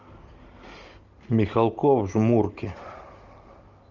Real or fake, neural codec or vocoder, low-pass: real; none; 7.2 kHz